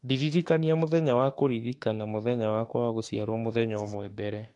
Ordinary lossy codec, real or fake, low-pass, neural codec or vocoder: AAC, 48 kbps; fake; 10.8 kHz; autoencoder, 48 kHz, 32 numbers a frame, DAC-VAE, trained on Japanese speech